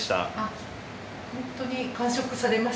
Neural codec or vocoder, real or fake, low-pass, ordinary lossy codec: none; real; none; none